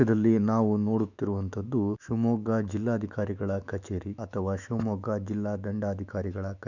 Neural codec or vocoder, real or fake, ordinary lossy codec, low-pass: autoencoder, 48 kHz, 128 numbers a frame, DAC-VAE, trained on Japanese speech; fake; none; 7.2 kHz